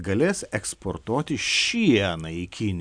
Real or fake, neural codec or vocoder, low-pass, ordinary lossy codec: real; none; 9.9 kHz; MP3, 96 kbps